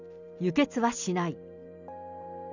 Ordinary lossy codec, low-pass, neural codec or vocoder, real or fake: none; 7.2 kHz; none; real